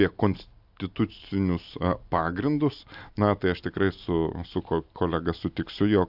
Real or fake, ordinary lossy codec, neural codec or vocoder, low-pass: real; AAC, 48 kbps; none; 5.4 kHz